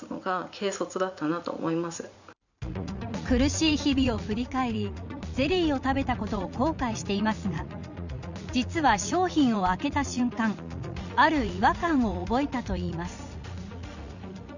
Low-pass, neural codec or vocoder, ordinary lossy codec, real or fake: 7.2 kHz; vocoder, 44.1 kHz, 80 mel bands, Vocos; none; fake